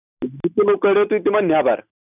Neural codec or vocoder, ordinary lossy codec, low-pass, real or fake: none; none; 3.6 kHz; real